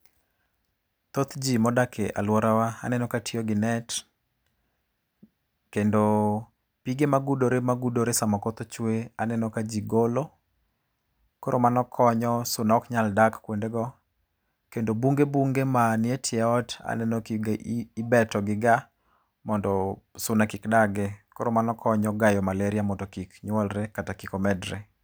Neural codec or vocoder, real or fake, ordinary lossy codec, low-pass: none; real; none; none